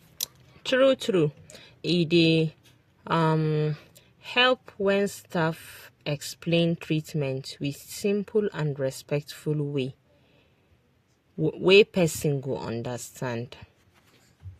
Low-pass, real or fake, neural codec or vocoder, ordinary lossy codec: 14.4 kHz; real; none; AAC, 48 kbps